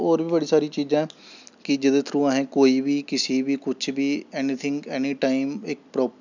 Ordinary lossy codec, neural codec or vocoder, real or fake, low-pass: none; none; real; 7.2 kHz